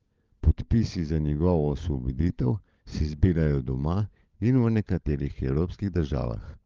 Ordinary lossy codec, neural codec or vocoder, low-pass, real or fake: Opus, 32 kbps; codec, 16 kHz, 16 kbps, FunCodec, trained on LibriTTS, 50 frames a second; 7.2 kHz; fake